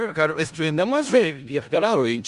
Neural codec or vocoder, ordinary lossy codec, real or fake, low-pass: codec, 16 kHz in and 24 kHz out, 0.4 kbps, LongCat-Audio-Codec, four codebook decoder; Opus, 64 kbps; fake; 10.8 kHz